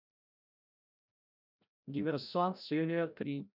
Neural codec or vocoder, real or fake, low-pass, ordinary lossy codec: codec, 16 kHz, 0.5 kbps, FreqCodec, larger model; fake; 5.4 kHz; none